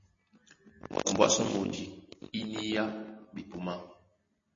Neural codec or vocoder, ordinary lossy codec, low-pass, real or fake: none; MP3, 32 kbps; 7.2 kHz; real